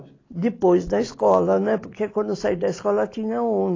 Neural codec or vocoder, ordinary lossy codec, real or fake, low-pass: none; AAC, 32 kbps; real; 7.2 kHz